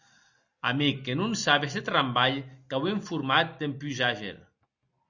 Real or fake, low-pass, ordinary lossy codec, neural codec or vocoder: real; 7.2 kHz; Opus, 64 kbps; none